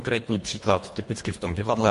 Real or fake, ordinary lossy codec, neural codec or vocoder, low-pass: fake; MP3, 48 kbps; codec, 24 kHz, 1.5 kbps, HILCodec; 10.8 kHz